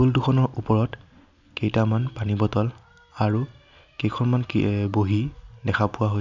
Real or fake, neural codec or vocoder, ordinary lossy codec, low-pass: real; none; none; 7.2 kHz